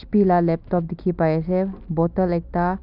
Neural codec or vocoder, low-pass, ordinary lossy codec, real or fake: none; 5.4 kHz; Opus, 64 kbps; real